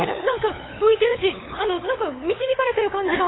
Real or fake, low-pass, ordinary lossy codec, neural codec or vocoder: fake; 7.2 kHz; AAC, 16 kbps; codec, 16 kHz, 8 kbps, FunCodec, trained on LibriTTS, 25 frames a second